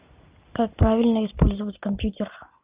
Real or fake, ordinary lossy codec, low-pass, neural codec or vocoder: real; Opus, 24 kbps; 3.6 kHz; none